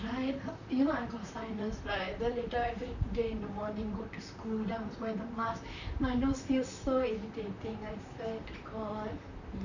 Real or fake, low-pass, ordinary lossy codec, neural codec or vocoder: fake; 7.2 kHz; none; vocoder, 44.1 kHz, 128 mel bands, Pupu-Vocoder